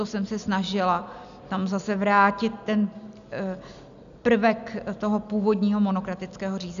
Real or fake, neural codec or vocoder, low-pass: real; none; 7.2 kHz